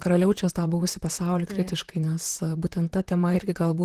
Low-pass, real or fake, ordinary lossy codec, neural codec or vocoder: 14.4 kHz; fake; Opus, 16 kbps; vocoder, 44.1 kHz, 128 mel bands, Pupu-Vocoder